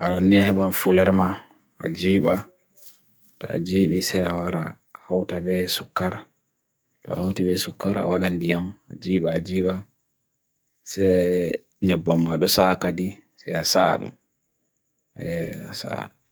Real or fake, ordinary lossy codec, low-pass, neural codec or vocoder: fake; none; none; codec, 44.1 kHz, 2.6 kbps, SNAC